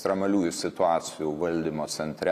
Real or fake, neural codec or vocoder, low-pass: real; none; 14.4 kHz